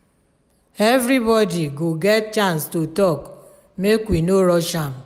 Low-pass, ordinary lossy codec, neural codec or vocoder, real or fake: 19.8 kHz; none; none; real